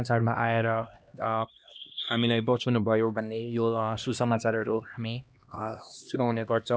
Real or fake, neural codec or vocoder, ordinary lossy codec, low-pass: fake; codec, 16 kHz, 1 kbps, X-Codec, HuBERT features, trained on LibriSpeech; none; none